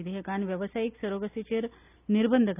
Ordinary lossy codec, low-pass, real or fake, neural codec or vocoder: none; 3.6 kHz; real; none